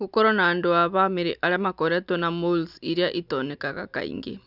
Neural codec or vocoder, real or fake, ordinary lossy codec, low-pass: none; real; none; 5.4 kHz